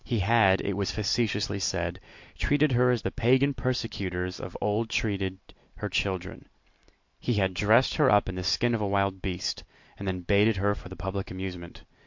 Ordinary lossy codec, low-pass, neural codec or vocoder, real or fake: MP3, 48 kbps; 7.2 kHz; none; real